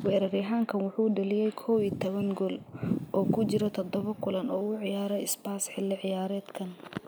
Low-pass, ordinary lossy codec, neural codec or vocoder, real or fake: none; none; none; real